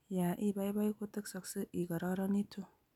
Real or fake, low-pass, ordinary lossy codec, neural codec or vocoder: real; 19.8 kHz; none; none